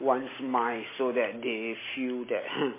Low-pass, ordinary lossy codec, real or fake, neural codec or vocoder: 3.6 kHz; MP3, 16 kbps; real; none